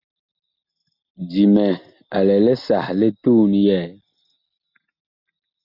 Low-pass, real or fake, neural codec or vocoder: 5.4 kHz; real; none